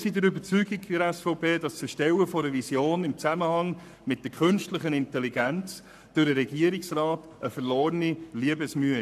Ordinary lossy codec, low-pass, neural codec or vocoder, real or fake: none; 14.4 kHz; codec, 44.1 kHz, 7.8 kbps, Pupu-Codec; fake